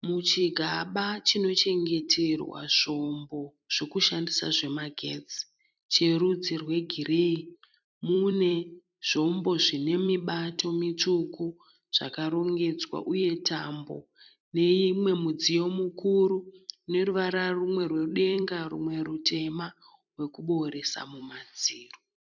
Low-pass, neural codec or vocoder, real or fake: 7.2 kHz; vocoder, 44.1 kHz, 128 mel bands every 256 samples, BigVGAN v2; fake